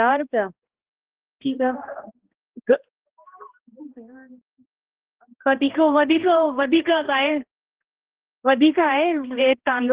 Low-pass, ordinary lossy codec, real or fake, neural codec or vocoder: 3.6 kHz; Opus, 16 kbps; fake; codec, 16 kHz, 1 kbps, X-Codec, HuBERT features, trained on balanced general audio